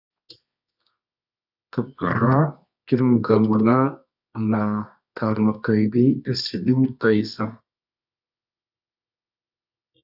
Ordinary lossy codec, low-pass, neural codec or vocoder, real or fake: AAC, 48 kbps; 5.4 kHz; codec, 24 kHz, 0.9 kbps, WavTokenizer, medium music audio release; fake